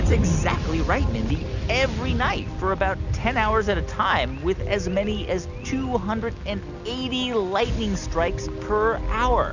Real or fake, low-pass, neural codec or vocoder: real; 7.2 kHz; none